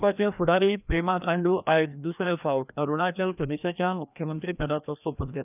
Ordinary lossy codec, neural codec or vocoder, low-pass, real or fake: none; codec, 16 kHz, 1 kbps, FreqCodec, larger model; 3.6 kHz; fake